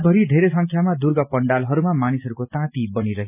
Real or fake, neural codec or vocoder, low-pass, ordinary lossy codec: real; none; 3.6 kHz; none